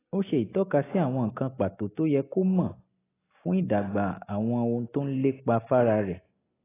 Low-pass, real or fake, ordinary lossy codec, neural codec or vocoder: 3.6 kHz; real; AAC, 16 kbps; none